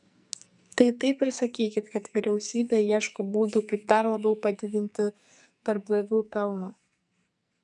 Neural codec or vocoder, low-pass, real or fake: codec, 44.1 kHz, 2.6 kbps, SNAC; 10.8 kHz; fake